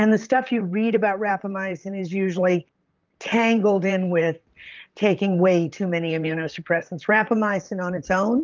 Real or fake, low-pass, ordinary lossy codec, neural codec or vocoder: fake; 7.2 kHz; Opus, 24 kbps; vocoder, 44.1 kHz, 128 mel bands, Pupu-Vocoder